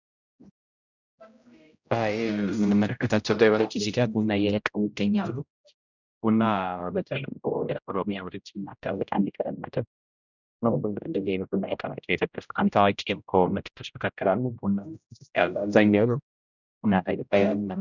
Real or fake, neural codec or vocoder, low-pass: fake; codec, 16 kHz, 0.5 kbps, X-Codec, HuBERT features, trained on general audio; 7.2 kHz